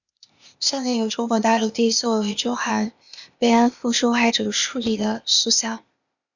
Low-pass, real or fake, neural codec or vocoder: 7.2 kHz; fake; codec, 16 kHz, 0.8 kbps, ZipCodec